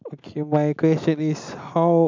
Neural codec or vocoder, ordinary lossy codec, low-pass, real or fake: none; MP3, 64 kbps; 7.2 kHz; real